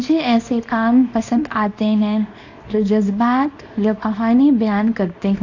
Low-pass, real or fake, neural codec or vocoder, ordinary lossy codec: 7.2 kHz; fake; codec, 24 kHz, 0.9 kbps, WavTokenizer, small release; AAC, 48 kbps